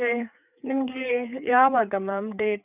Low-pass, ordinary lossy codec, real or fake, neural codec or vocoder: 3.6 kHz; none; fake; codec, 16 kHz, 4 kbps, FreqCodec, larger model